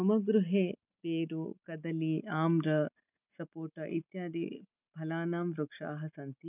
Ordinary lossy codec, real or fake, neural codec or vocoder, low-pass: none; real; none; 3.6 kHz